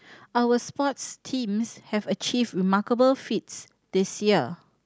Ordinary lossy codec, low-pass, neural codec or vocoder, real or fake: none; none; none; real